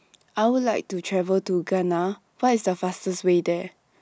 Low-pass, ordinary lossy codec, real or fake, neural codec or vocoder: none; none; real; none